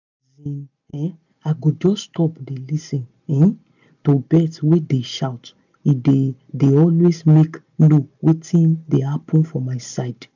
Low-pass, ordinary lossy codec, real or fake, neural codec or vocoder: 7.2 kHz; none; real; none